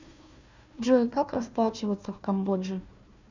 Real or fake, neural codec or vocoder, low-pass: fake; codec, 16 kHz, 1 kbps, FunCodec, trained on Chinese and English, 50 frames a second; 7.2 kHz